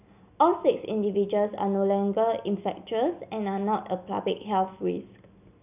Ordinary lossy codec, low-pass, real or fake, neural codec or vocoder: none; 3.6 kHz; real; none